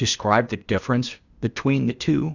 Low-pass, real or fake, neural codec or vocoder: 7.2 kHz; fake; codec, 16 kHz, 0.8 kbps, ZipCodec